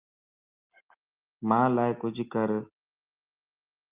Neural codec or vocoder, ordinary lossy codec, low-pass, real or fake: none; Opus, 24 kbps; 3.6 kHz; real